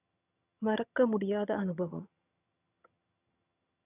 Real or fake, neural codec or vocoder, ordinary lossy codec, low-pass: fake; vocoder, 22.05 kHz, 80 mel bands, HiFi-GAN; none; 3.6 kHz